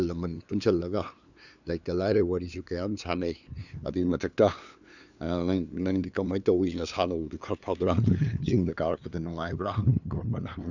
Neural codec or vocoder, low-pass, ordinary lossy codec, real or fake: codec, 16 kHz, 2 kbps, FunCodec, trained on LibriTTS, 25 frames a second; 7.2 kHz; none; fake